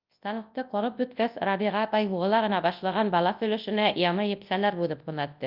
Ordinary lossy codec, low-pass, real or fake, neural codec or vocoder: Opus, 24 kbps; 5.4 kHz; fake; codec, 24 kHz, 0.9 kbps, WavTokenizer, large speech release